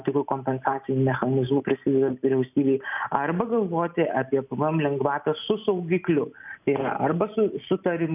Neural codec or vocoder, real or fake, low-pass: none; real; 3.6 kHz